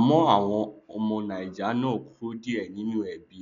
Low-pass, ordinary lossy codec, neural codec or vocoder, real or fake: 7.2 kHz; none; none; real